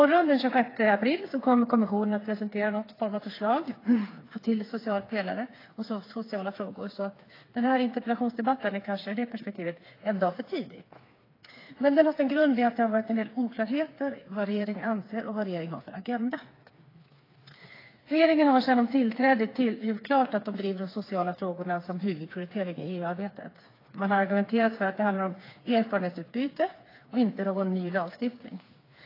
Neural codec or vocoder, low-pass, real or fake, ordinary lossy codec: codec, 16 kHz, 4 kbps, FreqCodec, smaller model; 5.4 kHz; fake; AAC, 24 kbps